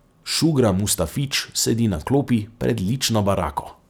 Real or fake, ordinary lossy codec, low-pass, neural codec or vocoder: real; none; none; none